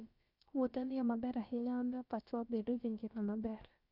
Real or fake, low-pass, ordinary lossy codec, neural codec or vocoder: fake; 5.4 kHz; Opus, 64 kbps; codec, 16 kHz, about 1 kbps, DyCAST, with the encoder's durations